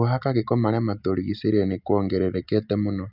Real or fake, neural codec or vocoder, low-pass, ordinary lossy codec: real; none; 5.4 kHz; none